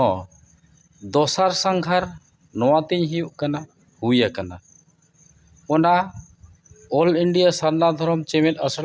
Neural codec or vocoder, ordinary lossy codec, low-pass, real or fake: none; none; none; real